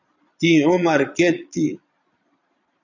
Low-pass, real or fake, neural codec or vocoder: 7.2 kHz; fake; vocoder, 22.05 kHz, 80 mel bands, Vocos